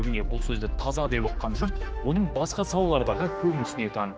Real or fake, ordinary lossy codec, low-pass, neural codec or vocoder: fake; none; none; codec, 16 kHz, 2 kbps, X-Codec, HuBERT features, trained on general audio